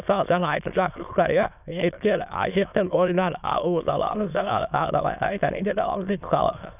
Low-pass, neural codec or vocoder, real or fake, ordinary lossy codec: 3.6 kHz; autoencoder, 22.05 kHz, a latent of 192 numbers a frame, VITS, trained on many speakers; fake; none